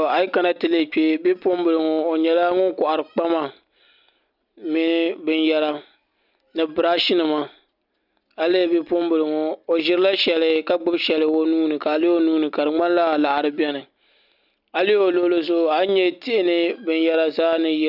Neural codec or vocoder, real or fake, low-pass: none; real; 5.4 kHz